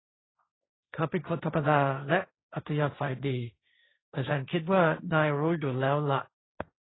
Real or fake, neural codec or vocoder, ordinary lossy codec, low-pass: fake; codec, 16 kHz, 1.1 kbps, Voila-Tokenizer; AAC, 16 kbps; 7.2 kHz